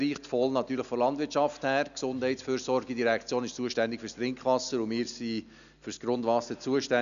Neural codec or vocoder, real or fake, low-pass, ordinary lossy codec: none; real; 7.2 kHz; none